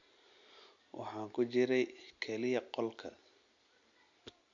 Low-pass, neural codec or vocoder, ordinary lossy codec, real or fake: 7.2 kHz; none; AAC, 64 kbps; real